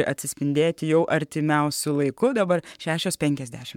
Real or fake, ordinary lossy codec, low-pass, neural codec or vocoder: fake; MP3, 96 kbps; 19.8 kHz; codec, 44.1 kHz, 7.8 kbps, Pupu-Codec